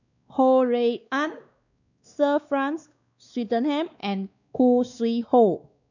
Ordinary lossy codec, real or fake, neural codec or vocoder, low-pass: none; fake; codec, 16 kHz, 2 kbps, X-Codec, WavLM features, trained on Multilingual LibriSpeech; 7.2 kHz